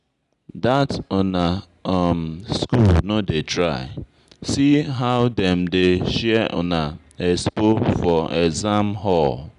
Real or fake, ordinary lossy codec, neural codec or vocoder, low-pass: real; none; none; 10.8 kHz